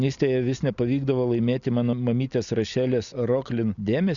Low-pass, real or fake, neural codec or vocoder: 7.2 kHz; real; none